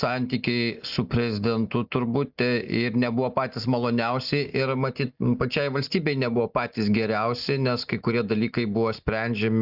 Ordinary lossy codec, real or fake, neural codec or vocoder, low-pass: Opus, 64 kbps; real; none; 5.4 kHz